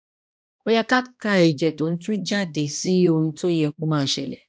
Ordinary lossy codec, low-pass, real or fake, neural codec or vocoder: none; none; fake; codec, 16 kHz, 1 kbps, X-Codec, HuBERT features, trained on balanced general audio